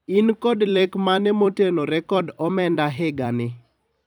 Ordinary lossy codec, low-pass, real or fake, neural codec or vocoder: none; 19.8 kHz; fake; vocoder, 44.1 kHz, 128 mel bands every 256 samples, BigVGAN v2